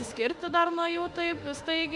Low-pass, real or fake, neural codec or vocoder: 10.8 kHz; fake; autoencoder, 48 kHz, 32 numbers a frame, DAC-VAE, trained on Japanese speech